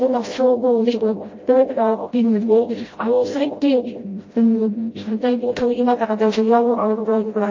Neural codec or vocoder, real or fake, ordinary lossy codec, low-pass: codec, 16 kHz, 0.5 kbps, FreqCodec, smaller model; fake; MP3, 32 kbps; 7.2 kHz